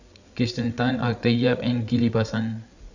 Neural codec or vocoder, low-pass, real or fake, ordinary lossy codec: codec, 16 kHz, 8 kbps, FreqCodec, larger model; 7.2 kHz; fake; none